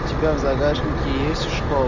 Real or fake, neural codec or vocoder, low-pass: real; none; 7.2 kHz